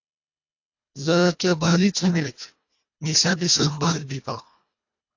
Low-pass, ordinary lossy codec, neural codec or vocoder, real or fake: 7.2 kHz; AAC, 48 kbps; codec, 24 kHz, 1.5 kbps, HILCodec; fake